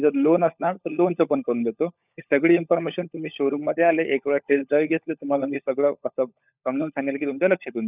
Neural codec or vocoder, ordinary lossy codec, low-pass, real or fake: codec, 16 kHz, 16 kbps, FunCodec, trained on LibriTTS, 50 frames a second; none; 3.6 kHz; fake